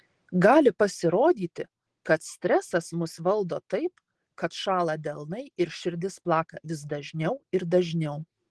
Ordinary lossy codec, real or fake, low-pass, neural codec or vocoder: Opus, 16 kbps; real; 10.8 kHz; none